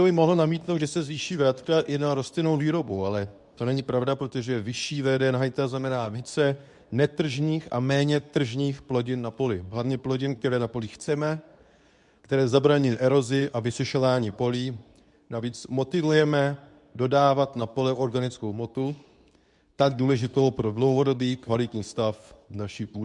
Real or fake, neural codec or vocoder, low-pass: fake; codec, 24 kHz, 0.9 kbps, WavTokenizer, medium speech release version 2; 10.8 kHz